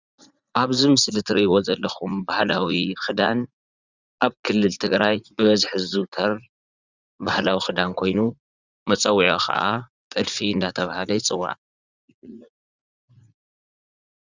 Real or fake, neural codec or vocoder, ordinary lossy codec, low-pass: fake; vocoder, 44.1 kHz, 80 mel bands, Vocos; Opus, 64 kbps; 7.2 kHz